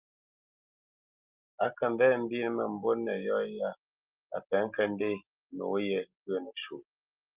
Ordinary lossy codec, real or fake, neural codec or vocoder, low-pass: Opus, 32 kbps; real; none; 3.6 kHz